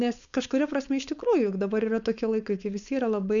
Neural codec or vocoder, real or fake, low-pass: codec, 16 kHz, 4.8 kbps, FACodec; fake; 7.2 kHz